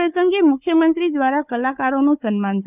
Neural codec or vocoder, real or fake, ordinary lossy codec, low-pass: codec, 16 kHz, 4 kbps, FunCodec, trained on Chinese and English, 50 frames a second; fake; none; 3.6 kHz